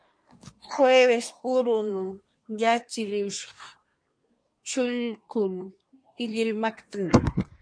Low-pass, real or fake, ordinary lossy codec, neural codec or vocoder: 9.9 kHz; fake; MP3, 48 kbps; codec, 24 kHz, 1 kbps, SNAC